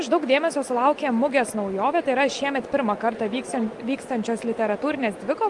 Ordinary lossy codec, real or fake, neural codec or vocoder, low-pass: Opus, 32 kbps; real; none; 10.8 kHz